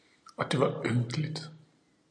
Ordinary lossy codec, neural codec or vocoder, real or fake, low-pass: AAC, 64 kbps; none; real; 9.9 kHz